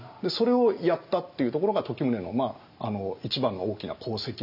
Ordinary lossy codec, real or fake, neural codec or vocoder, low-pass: none; real; none; 5.4 kHz